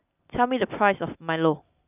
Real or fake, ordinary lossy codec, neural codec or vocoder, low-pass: real; none; none; 3.6 kHz